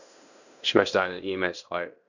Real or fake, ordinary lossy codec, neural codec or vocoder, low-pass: fake; none; codec, 16 kHz in and 24 kHz out, 0.9 kbps, LongCat-Audio-Codec, fine tuned four codebook decoder; 7.2 kHz